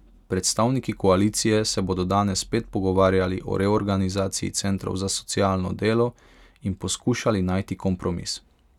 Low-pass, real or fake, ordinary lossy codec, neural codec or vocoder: 19.8 kHz; real; none; none